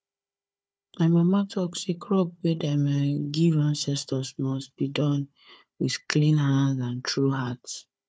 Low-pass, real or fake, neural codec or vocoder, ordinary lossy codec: none; fake; codec, 16 kHz, 4 kbps, FunCodec, trained on Chinese and English, 50 frames a second; none